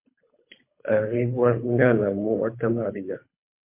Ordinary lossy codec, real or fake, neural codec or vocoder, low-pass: MP3, 24 kbps; fake; codec, 24 kHz, 3 kbps, HILCodec; 3.6 kHz